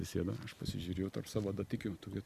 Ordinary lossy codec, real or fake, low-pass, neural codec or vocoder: Opus, 64 kbps; real; 14.4 kHz; none